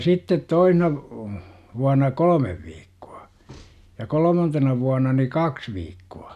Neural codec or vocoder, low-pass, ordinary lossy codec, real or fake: none; 14.4 kHz; none; real